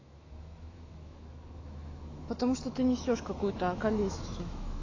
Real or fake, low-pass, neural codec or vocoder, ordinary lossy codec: fake; 7.2 kHz; autoencoder, 48 kHz, 128 numbers a frame, DAC-VAE, trained on Japanese speech; AAC, 32 kbps